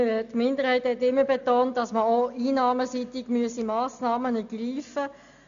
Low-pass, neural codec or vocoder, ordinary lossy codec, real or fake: 7.2 kHz; none; none; real